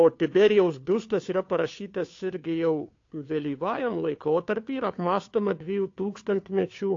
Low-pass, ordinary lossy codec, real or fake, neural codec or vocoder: 7.2 kHz; AAC, 48 kbps; fake; codec, 16 kHz, 2 kbps, FunCodec, trained on Chinese and English, 25 frames a second